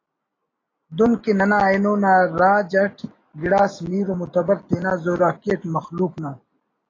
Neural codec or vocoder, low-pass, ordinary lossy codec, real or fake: none; 7.2 kHz; AAC, 32 kbps; real